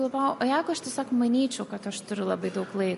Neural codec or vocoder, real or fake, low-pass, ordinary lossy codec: none; real; 14.4 kHz; MP3, 48 kbps